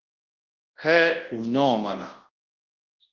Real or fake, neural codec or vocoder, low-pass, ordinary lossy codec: fake; codec, 24 kHz, 0.9 kbps, WavTokenizer, large speech release; 7.2 kHz; Opus, 16 kbps